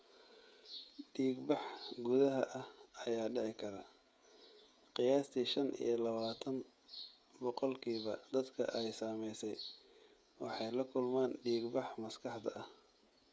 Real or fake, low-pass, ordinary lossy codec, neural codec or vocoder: fake; none; none; codec, 16 kHz, 16 kbps, FreqCodec, smaller model